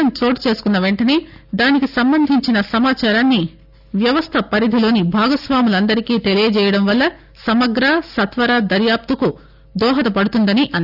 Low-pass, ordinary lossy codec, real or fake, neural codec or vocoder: 5.4 kHz; none; real; none